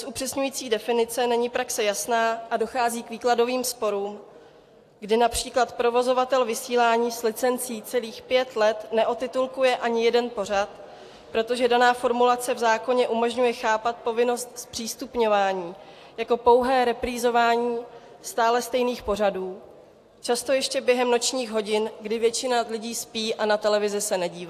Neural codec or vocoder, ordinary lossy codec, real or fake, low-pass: none; AAC, 64 kbps; real; 14.4 kHz